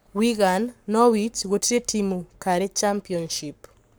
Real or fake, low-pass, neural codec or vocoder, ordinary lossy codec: fake; none; codec, 44.1 kHz, 7.8 kbps, Pupu-Codec; none